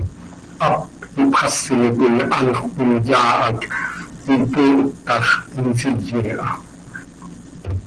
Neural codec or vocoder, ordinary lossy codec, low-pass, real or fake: none; Opus, 16 kbps; 9.9 kHz; real